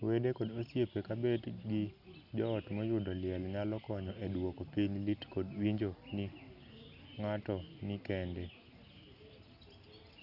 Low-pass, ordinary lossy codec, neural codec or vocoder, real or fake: 5.4 kHz; none; none; real